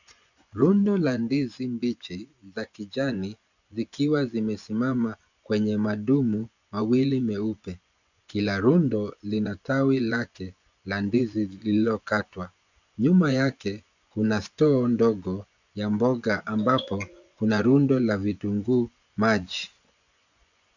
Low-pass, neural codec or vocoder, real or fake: 7.2 kHz; vocoder, 24 kHz, 100 mel bands, Vocos; fake